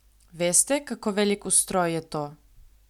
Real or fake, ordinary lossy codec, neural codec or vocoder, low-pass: real; none; none; 19.8 kHz